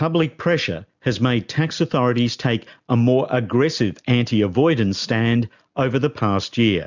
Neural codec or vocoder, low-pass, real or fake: none; 7.2 kHz; real